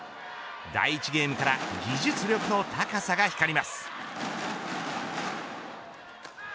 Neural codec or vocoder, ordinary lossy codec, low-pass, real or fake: none; none; none; real